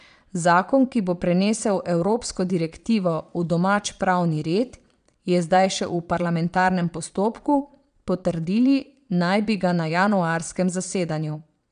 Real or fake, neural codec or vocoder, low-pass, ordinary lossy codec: fake; vocoder, 22.05 kHz, 80 mel bands, Vocos; 9.9 kHz; none